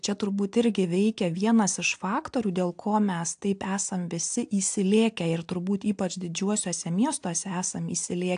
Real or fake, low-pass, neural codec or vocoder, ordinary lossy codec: real; 9.9 kHz; none; AAC, 64 kbps